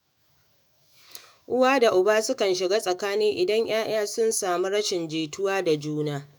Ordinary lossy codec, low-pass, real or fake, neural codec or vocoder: none; none; fake; autoencoder, 48 kHz, 128 numbers a frame, DAC-VAE, trained on Japanese speech